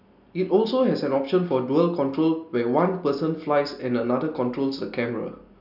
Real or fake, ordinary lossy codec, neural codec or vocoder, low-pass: real; none; none; 5.4 kHz